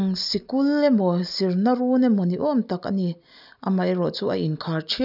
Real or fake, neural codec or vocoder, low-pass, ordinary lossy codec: real; none; 5.4 kHz; none